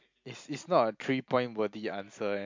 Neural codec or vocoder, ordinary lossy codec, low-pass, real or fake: none; MP3, 64 kbps; 7.2 kHz; real